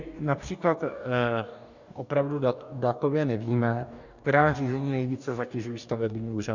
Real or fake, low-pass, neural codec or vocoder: fake; 7.2 kHz; codec, 44.1 kHz, 2.6 kbps, DAC